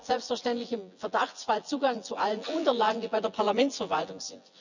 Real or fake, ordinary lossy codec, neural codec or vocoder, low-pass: fake; none; vocoder, 24 kHz, 100 mel bands, Vocos; 7.2 kHz